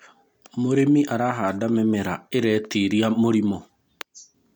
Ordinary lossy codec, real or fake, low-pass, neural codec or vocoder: MP3, 96 kbps; real; 19.8 kHz; none